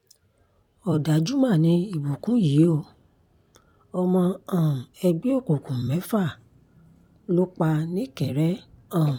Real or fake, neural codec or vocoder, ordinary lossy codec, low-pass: fake; vocoder, 44.1 kHz, 128 mel bands every 256 samples, BigVGAN v2; none; 19.8 kHz